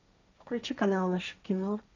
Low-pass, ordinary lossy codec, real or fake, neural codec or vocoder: 7.2 kHz; none; fake; codec, 16 kHz, 1.1 kbps, Voila-Tokenizer